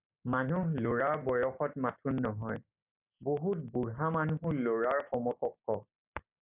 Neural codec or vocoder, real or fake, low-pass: none; real; 3.6 kHz